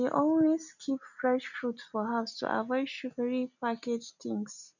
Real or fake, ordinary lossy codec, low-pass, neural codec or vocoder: real; none; 7.2 kHz; none